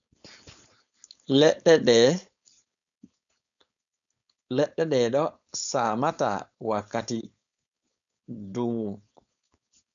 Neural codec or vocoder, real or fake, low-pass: codec, 16 kHz, 4.8 kbps, FACodec; fake; 7.2 kHz